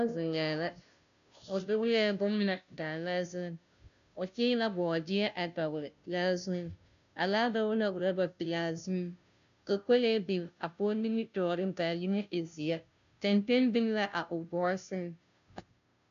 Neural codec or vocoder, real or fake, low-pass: codec, 16 kHz, 0.5 kbps, FunCodec, trained on Chinese and English, 25 frames a second; fake; 7.2 kHz